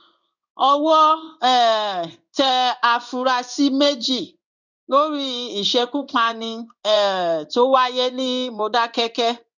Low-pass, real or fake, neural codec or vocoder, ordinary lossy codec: 7.2 kHz; fake; codec, 16 kHz in and 24 kHz out, 1 kbps, XY-Tokenizer; none